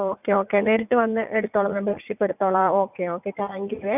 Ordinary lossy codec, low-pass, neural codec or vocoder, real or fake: none; 3.6 kHz; vocoder, 44.1 kHz, 80 mel bands, Vocos; fake